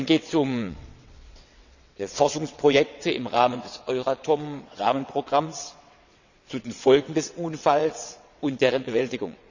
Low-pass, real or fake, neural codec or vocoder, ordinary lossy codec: 7.2 kHz; fake; vocoder, 22.05 kHz, 80 mel bands, WaveNeXt; none